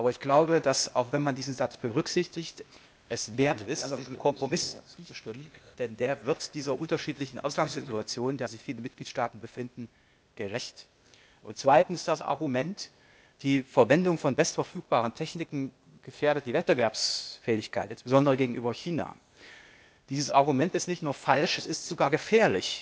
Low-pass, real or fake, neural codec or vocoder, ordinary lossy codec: none; fake; codec, 16 kHz, 0.8 kbps, ZipCodec; none